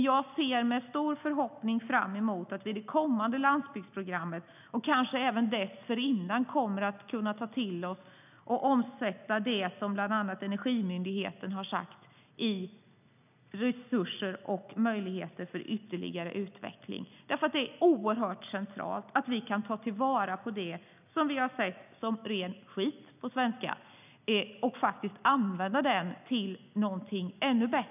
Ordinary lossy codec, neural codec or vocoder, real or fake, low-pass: none; none; real; 3.6 kHz